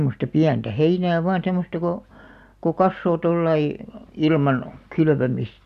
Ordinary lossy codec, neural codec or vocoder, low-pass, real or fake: none; none; 14.4 kHz; real